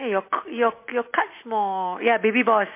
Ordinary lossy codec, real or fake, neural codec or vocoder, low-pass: MP3, 24 kbps; real; none; 3.6 kHz